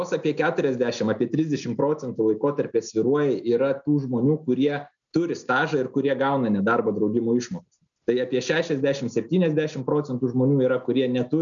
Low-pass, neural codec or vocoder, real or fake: 7.2 kHz; none; real